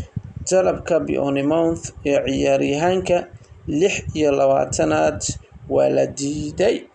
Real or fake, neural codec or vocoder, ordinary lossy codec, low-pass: real; none; none; 9.9 kHz